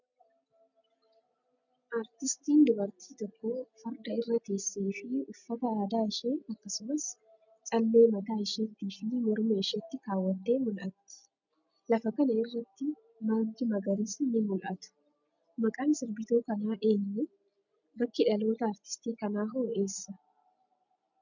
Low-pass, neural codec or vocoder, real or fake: 7.2 kHz; none; real